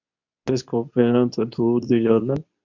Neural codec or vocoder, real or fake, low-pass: codec, 24 kHz, 0.9 kbps, WavTokenizer, medium speech release version 2; fake; 7.2 kHz